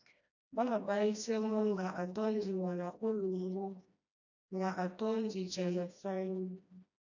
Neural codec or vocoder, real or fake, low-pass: codec, 16 kHz, 1 kbps, FreqCodec, smaller model; fake; 7.2 kHz